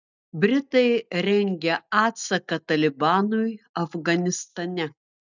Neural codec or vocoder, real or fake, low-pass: none; real; 7.2 kHz